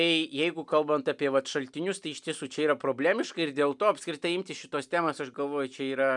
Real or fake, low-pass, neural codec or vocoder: real; 10.8 kHz; none